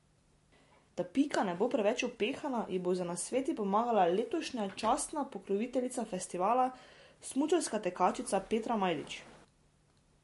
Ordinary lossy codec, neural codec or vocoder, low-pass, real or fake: MP3, 48 kbps; none; 14.4 kHz; real